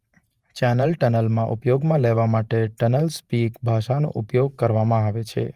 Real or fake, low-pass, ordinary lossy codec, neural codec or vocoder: fake; 14.4 kHz; Opus, 32 kbps; vocoder, 48 kHz, 128 mel bands, Vocos